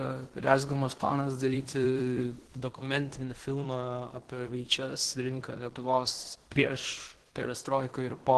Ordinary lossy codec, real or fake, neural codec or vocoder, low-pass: Opus, 16 kbps; fake; codec, 16 kHz in and 24 kHz out, 0.9 kbps, LongCat-Audio-Codec, fine tuned four codebook decoder; 10.8 kHz